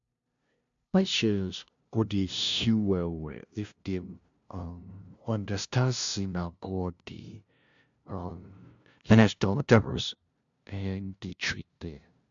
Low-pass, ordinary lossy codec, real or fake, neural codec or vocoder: 7.2 kHz; MP3, 64 kbps; fake; codec, 16 kHz, 0.5 kbps, FunCodec, trained on LibriTTS, 25 frames a second